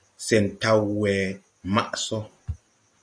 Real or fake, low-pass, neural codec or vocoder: real; 9.9 kHz; none